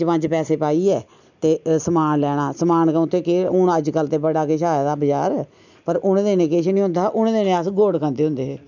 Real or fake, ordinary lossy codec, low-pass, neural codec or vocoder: real; none; 7.2 kHz; none